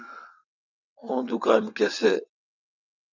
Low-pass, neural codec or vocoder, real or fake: 7.2 kHz; vocoder, 22.05 kHz, 80 mel bands, WaveNeXt; fake